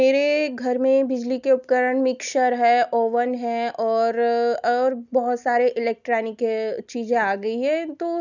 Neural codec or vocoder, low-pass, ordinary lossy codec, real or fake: none; 7.2 kHz; none; real